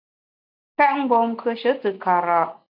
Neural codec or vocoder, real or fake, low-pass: none; real; 5.4 kHz